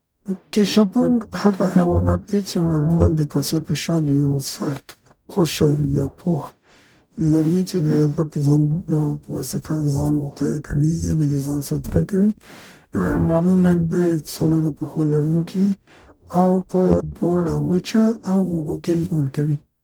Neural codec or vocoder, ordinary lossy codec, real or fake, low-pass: codec, 44.1 kHz, 0.9 kbps, DAC; none; fake; none